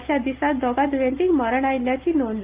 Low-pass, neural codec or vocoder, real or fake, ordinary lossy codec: 3.6 kHz; none; real; Opus, 32 kbps